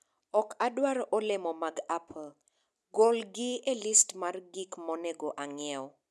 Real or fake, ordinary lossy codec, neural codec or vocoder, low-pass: real; none; none; none